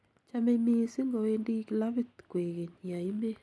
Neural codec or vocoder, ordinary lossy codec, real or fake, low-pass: none; none; real; none